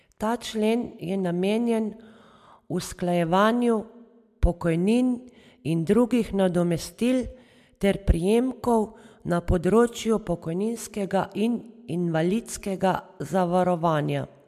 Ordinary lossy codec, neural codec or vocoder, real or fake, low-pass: MP3, 96 kbps; none; real; 14.4 kHz